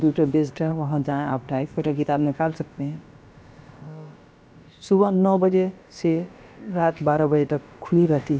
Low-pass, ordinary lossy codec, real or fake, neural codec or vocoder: none; none; fake; codec, 16 kHz, about 1 kbps, DyCAST, with the encoder's durations